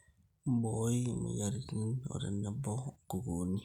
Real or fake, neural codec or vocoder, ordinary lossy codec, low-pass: real; none; none; 19.8 kHz